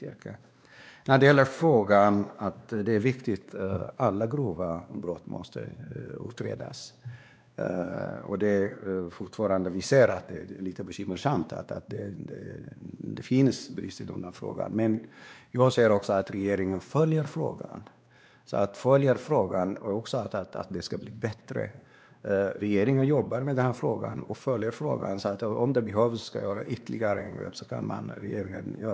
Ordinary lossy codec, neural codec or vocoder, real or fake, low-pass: none; codec, 16 kHz, 2 kbps, X-Codec, WavLM features, trained on Multilingual LibriSpeech; fake; none